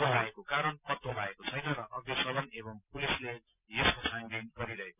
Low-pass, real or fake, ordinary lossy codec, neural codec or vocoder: 3.6 kHz; real; none; none